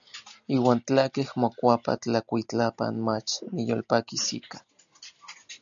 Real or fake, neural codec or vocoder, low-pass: real; none; 7.2 kHz